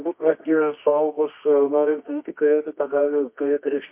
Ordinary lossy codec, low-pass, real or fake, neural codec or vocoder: MP3, 24 kbps; 3.6 kHz; fake; codec, 24 kHz, 0.9 kbps, WavTokenizer, medium music audio release